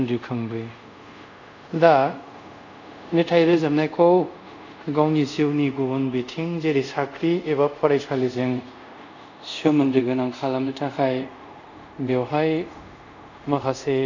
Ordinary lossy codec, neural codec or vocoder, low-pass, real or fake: none; codec, 24 kHz, 0.5 kbps, DualCodec; 7.2 kHz; fake